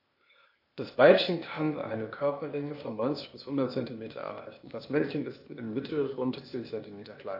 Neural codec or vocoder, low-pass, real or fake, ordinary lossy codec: codec, 16 kHz, 0.8 kbps, ZipCodec; 5.4 kHz; fake; MP3, 32 kbps